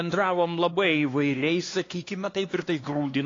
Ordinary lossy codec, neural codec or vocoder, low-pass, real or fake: AAC, 32 kbps; codec, 16 kHz, 2 kbps, X-Codec, HuBERT features, trained on LibriSpeech; 7.2 kHz; fake